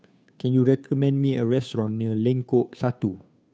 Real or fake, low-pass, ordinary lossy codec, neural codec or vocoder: fake; none; none; codec, 16 kHz, 2 kbps, FunCodec, trained on Chinese and English, 25 frames a second